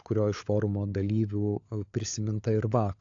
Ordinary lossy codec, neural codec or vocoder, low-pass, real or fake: AAC, 48 kbps; codec, 16 kHz, 16 kbps, FunCodec, trained on LibriTTS, 50 frames a second; 7.2 kHz; fake